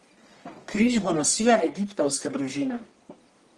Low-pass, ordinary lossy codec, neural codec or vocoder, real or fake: 10.8 kHz; Opus, 24 kbps; codec, 44.1 kHz, 1.7 kbps, Pupu-Codec; fake